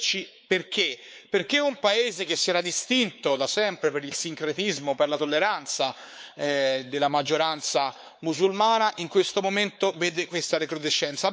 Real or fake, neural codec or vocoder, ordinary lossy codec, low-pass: fake; codec, 16 kHz, 4 kbps, X-Codec, WavLM features, trained on Multilingual LibriSpeech; none; none